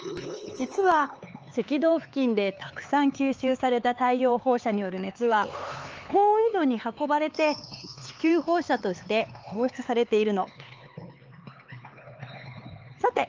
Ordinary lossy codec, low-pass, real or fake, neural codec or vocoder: Opus, 24 kbps; 7.2 kHz; fake; codec, 16 kHz, 4 kbps, X-Codec, HuBERT features, trained on LibriSpeech